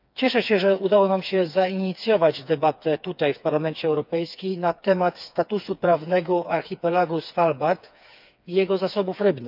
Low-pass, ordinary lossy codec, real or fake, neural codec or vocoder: 5.4 kHz; none; fake; codec, 16 kHz, 4 kbps, FreqCodec, smaller model